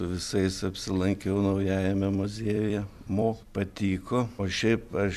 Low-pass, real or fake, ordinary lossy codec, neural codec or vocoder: 14.4 kHz; fake; MP3, 96 kbps; vocoder, 44.1 kHz, 128 mel bands every 256 samples, BigVGAN v2